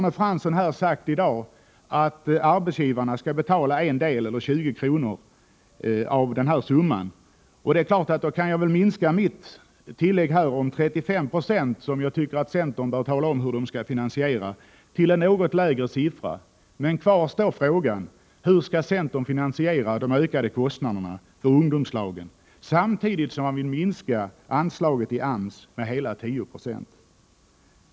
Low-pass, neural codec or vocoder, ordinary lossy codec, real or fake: none; none; none; real